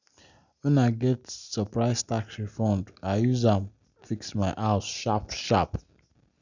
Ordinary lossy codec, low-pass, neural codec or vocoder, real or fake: none; 7.2 kHz; none; real